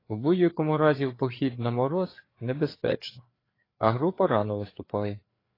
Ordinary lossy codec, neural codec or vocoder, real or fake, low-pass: AAC, 24 kbps; codec, 16 kHz, 4 kbps, FreqCodec, larger model; fake; 5.4 kHz